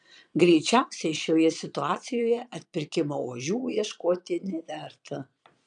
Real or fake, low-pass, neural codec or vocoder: fake; 9.9 kHz; vocoder, 22.05 kHz, 80 mel bands, Vocos